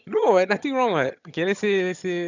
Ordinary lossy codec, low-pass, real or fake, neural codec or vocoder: none; 7.2 kHz; fake; vocoder, 22.05 kHz, 80 mel bands, HiFi-GAN